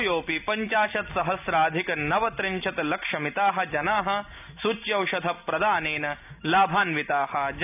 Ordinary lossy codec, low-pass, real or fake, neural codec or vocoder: none; 3.6 kHz; real; none